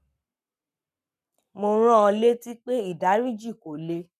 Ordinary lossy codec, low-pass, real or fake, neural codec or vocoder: none; 14.4 kHz; fake; codec, 44.1 kHz, 7.8 kbps, Pupu-Codec